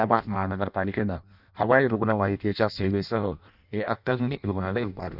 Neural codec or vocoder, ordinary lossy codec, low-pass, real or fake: codec, 16 kHz in and 24 kHz out, 0.6 kbps, FireRedTTS-2 codec; none; 5.4 kHz; fake